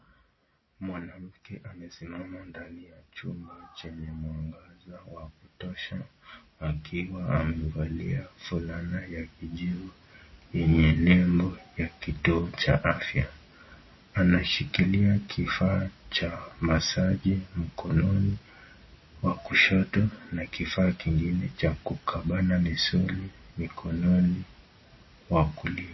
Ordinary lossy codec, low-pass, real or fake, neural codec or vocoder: MP3, 24 kbps; 7.2 kHz; real; none